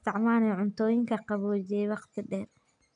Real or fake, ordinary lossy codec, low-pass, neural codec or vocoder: real; none; 9.9 kHz; none